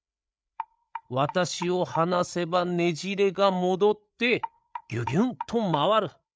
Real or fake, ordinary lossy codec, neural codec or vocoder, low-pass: fake; none; codec, 16 kHz, 8 kbps, FreqCodec, larger model; none